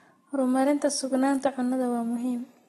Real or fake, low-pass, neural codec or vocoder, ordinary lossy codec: real; 19.8 kHz; none; AAC, 32 kbps